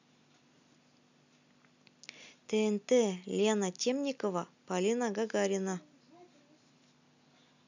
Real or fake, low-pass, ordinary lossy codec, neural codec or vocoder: real; 7.2 kHz; none; none